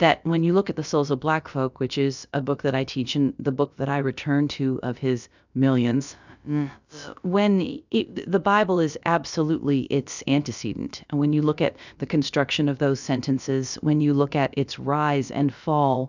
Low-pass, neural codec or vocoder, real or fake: 7.2 kHz; codec, 16 kHz, about 1 kbps, DyCAST, with the encoder's durations; fake